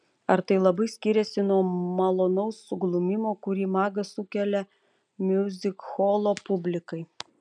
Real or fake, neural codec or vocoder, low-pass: real; none; 9.9 kHz